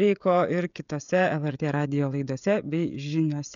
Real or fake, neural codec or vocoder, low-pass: fake; codec, 16 kHz, 16 kbps, FreqCodec, smaller model; 7.2 kHz